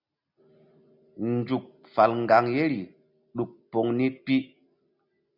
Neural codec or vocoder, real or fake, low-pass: none; real; 5.4 kHz